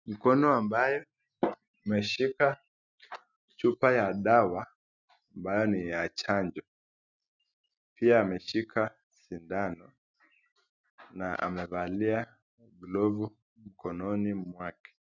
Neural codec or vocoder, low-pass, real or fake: none; 7.2 kHz; real